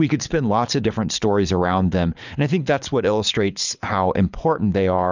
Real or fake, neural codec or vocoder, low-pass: fake; codec, 24 kHz, 6 kbps, HILCodec; 7.2 kHz